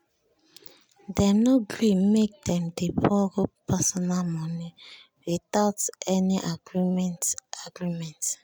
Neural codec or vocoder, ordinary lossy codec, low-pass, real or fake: none; none; none; real